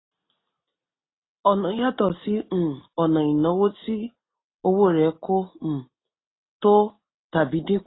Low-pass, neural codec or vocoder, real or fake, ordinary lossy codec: 7.2 kHz; none; real; AAC, 16 kbps